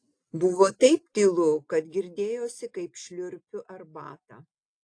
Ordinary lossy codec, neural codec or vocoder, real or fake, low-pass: AAC, 48 kbps; none; real; 9.9 kHz